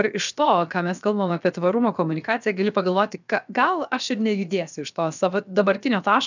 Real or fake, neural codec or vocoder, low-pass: fake; codec, 16 kHz, about 1 kbps, DyCAST, with the encoder's durations; 7.2 kHz